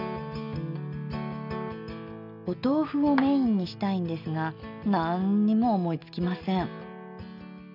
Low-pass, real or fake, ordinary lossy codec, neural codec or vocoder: 5.4 kHz; real; none; none